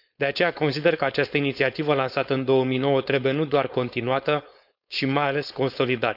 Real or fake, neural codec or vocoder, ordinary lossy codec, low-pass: fake; codec, 16 kHz, 4.8 kbps, FACodec; none; 5.4 kHz